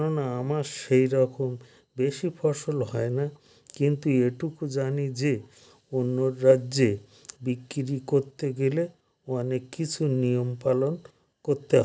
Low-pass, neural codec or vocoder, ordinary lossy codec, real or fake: none; none; none; real